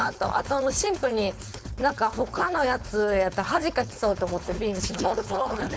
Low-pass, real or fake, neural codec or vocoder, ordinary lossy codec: none; fake; codec, 16 kHz, 4.8 kbps, FACodec; none